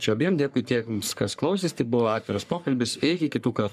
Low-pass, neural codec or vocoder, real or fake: 14.4 kHz; codec, 44.1 kHz, 3.4 kbps, Pupu-Codec; fake